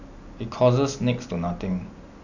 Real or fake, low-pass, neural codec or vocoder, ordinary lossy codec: real; 7.2 kHz; none; none